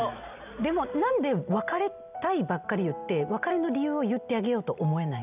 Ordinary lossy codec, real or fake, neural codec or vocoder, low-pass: none; real; none; 3.6 kHz